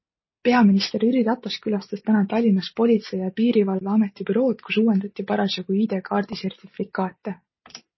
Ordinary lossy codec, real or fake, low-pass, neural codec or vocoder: MP3, 24 kbps; real; 7.2 kHz; none